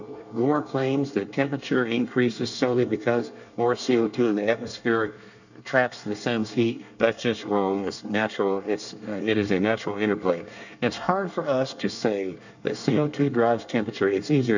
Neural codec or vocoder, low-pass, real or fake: codec, 24 kHz, 1 kbps, SNAC; 7.2 kHz; fake